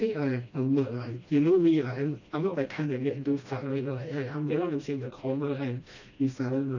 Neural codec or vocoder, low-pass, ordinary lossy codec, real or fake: codec, 16 kHz, 1 kbps, FreqCodec, smaller model; 7.2 kHz; none; fake